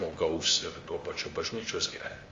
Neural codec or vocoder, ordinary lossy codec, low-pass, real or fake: codec, 16 kHz, 0.8 kbps, ZipCodec; Opus, 32 kbps; 7.2 kHz; fake